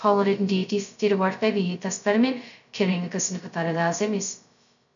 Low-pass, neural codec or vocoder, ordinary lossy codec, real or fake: 7.2 kHz; codec, 16 kHz, 0.2 kbps, FocalCodec; none; fake